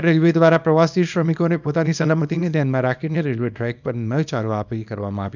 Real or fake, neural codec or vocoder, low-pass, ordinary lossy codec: fake; codec, 24 kHz, 0.9 kbps, WavTokenizer, small release; 7.2 kHz; none